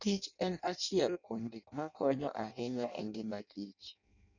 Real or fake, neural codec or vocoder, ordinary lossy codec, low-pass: fake; codec, 16 kHz in and 24 kHz out, 0.6 kbps, FireRedTTS-2 codec; none; 7.2 kHz